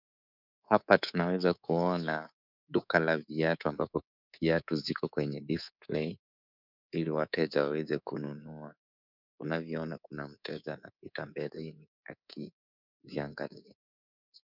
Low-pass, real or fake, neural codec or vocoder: 5.4 kHz; fake; codec, 24 kHz, 3.1 kbps, DualCodec